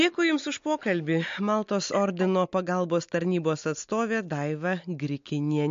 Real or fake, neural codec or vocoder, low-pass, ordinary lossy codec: real; none; 7.2 kHz; MP3, 48 kbps